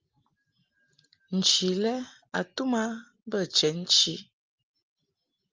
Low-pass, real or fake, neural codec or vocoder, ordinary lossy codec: 7.2 kHz; real; none; Opus, 24 kbps